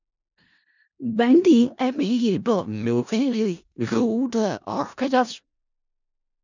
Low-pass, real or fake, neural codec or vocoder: 7.2 kHz; fake; codec, 16 kHz in and 24 kHz out, 0.4 kbps, LongCat-Audio-Codec, four codebook decoder